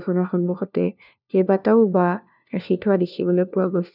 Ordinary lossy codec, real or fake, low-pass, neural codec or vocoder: none; fake; 5.4 kHz; codec, 16 kHz, 1 kbps, FunCodec, trained on LibriTTS, 50 frames a second